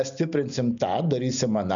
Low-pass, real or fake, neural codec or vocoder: 7.2 kHz; real; none